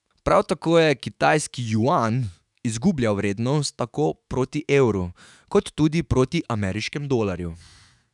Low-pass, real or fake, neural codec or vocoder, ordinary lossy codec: 10.8 kHz; fake; autoencoder, 48 kHz, 128 numbers a frame, DAC-VAE, trained on Japanese speech; none